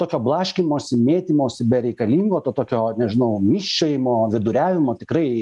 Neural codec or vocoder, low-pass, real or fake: none; 10.8 kHz; real